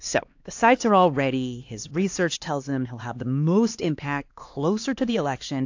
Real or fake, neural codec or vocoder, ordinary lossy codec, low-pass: fake; codec, 16 kHz, 2 kbps, X-Codec, HuBERT features, trained on LibriSpeech; AAC, 48 kbps; 7.2 kHz